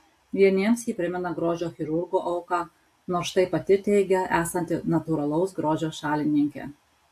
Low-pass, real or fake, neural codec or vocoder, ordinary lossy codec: 14.4 kHz; real; none; AAC, 64 kbps